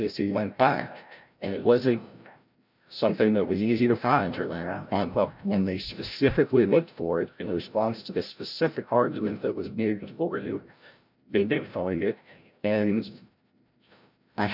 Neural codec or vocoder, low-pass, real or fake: codec, 16 kHz, 0.5 kbps, FreqCodec, larger model; 5.4 kHz; fake